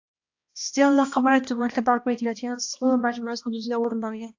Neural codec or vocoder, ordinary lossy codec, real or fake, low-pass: codec, 16 kHz, 1 kbps, X-Codec, HuBERT features, trained on balanced general audio; AAC, 48 kbps; fake; 7.2 kHz